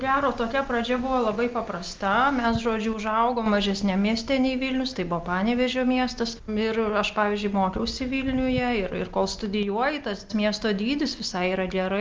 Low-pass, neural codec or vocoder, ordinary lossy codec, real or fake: 7.2 kHz; none; Opus, 32 kbps; real